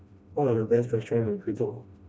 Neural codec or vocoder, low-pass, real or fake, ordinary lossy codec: codec, 16 kHz, 1 kbps, FreqCodec, smaller model; none; fake; none